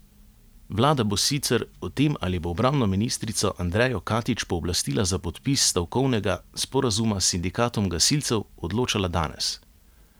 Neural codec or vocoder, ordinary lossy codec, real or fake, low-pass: none; none; real; none